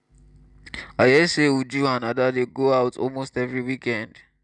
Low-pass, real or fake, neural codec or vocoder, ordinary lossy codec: 10.8 kHz; real; none; none